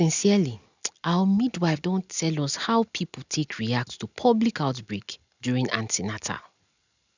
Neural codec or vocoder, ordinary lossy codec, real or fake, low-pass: none; none; real; 7.2 kHz